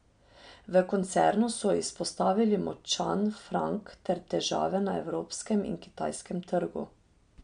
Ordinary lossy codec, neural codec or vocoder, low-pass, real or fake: MP3, 64 kbps; none; 9.9 kHz; real